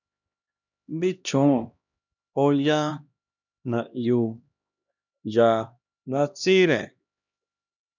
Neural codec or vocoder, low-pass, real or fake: codec, 16 kHz, 1 kbps, X-Codec, HuBERT features, trained on LibriSpeech; 7.2 kHz; fake